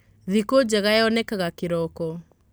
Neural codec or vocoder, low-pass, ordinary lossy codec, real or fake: none; none; none; real